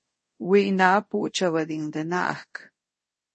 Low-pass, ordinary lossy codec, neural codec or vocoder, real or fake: 10.8 kHz; MP3, 32 kbps; codec, 24 kHz, 0.5 kbps, DualCodec; fake